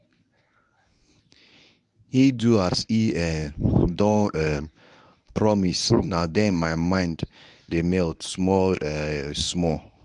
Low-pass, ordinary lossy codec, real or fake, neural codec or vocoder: 10.8 kHz; none; fake; codec, 24 kHz, 0.9 kbps, WavTokenizer, medium speech release version 1